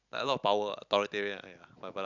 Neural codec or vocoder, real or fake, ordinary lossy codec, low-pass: none; real; none; 7.2 kHz